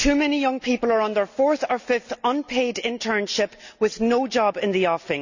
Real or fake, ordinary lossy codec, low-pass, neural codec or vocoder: real; none; 7.2 kHz; none